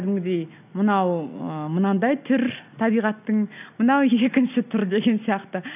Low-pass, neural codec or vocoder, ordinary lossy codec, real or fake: 3.6 kHz; none; none; real